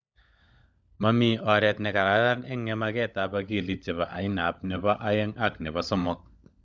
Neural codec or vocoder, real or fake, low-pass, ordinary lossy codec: codec, 16 kHz, 16 kbps, FunCodec, trained on LibriTTS, 50 frames a second; fake; none; none